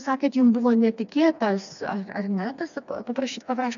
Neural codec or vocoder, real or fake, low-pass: codec, 16 kHz, 2 kbps, FreqCodec, smaller model; fake; 7.2 kHz